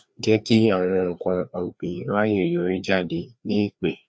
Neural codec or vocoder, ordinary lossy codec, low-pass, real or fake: codec, 16 kHz, 2 kbps, FreqCodec, larger model; none; none; fake